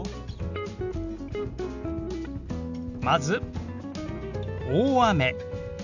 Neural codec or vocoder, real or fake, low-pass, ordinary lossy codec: none; real; 7.2 kHz; none